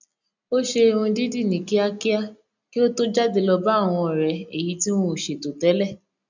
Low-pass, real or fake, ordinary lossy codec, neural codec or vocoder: 7.2 kHz; real; none; none